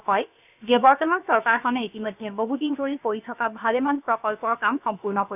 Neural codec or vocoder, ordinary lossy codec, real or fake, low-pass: codec, 16 kHz, about 1 kbps, DyCAST, with the encoder's durations; none; fake; 3.6 kHz